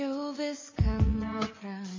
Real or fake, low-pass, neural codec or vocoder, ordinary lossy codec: fake; 7.2 kHz; vocoder, 24 kHz, 100 mel bands, Vocos; MP3, 32 kbps